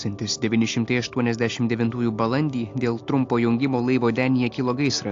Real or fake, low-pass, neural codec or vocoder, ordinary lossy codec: real; 7.2 kHz; none; AAC, 48 kbps